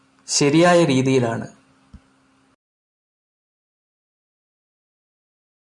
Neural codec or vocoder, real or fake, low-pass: none; real; 10.8 kHz